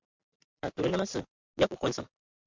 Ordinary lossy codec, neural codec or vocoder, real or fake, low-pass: MP3, 64 kbps; vocoder, 44.1 kHz, 128 mel bands every 512 samples, BigVGAN v2; fake; 7.2 kHz